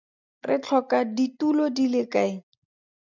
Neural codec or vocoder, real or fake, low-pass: none; real; 7.2 kHz